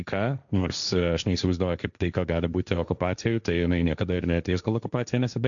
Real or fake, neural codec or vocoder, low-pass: fake; codec, 16 kHz, 1.1 kbps, Voila-Tokenizer; 7.2 kHz